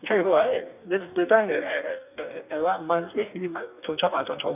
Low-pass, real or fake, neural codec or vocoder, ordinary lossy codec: 3.6 kHz; fake; codec, 44.1 kHz, 2.6 kbps, DAC; none